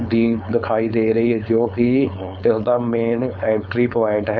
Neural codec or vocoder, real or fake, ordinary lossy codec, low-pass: codec, 16 kHz, 4.8 kbps, FACodec; fake; none; none